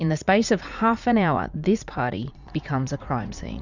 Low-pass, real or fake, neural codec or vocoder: 7.2 kHz; fake; vocoder, 44.1 kHz, 80 mel bands, Vocos